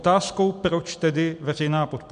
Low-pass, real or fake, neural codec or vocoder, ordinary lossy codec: 9.9 kHz; real; none; MP3, 64 kbps